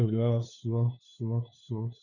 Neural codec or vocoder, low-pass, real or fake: codec, 16 kHz, 2 kbps, FunCodec, trained on LibriTTS, 25 frames a second; 7.2 kHz; fake